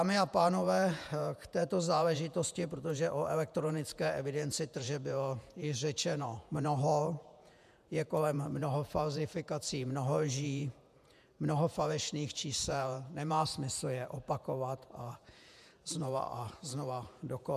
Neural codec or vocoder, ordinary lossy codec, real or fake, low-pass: vocoder, 48 kHz, 128 mel bands, Vocos; MP3, 96 kbps; fake; 14.4 kHz